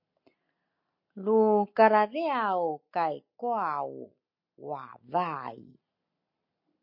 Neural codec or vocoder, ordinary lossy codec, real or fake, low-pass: none; MP3, 32 kbps; real; 5.4 kHz